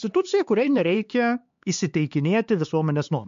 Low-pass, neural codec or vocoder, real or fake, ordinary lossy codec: 7.2 kHz; codec, 16 kHz, 4 kbps, X-Codec, HuBERT features, trained on LibriSpeech; fake; MP3, 48 kbps